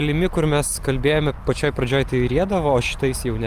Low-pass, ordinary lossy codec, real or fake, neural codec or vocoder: 14.4 kHz; Opus, 32 kbps; real; none